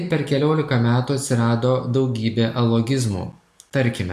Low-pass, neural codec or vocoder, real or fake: 14.4 kHz; none; real